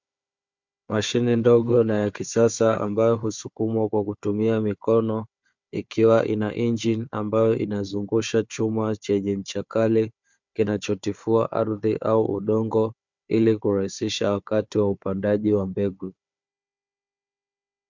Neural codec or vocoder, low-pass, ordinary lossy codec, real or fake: codec, 16 kHz, 4 kbps, FunCodec, trained on Chinese and English, 50 frames a second; 7.2 kHz; MP3, 64 kbps; fake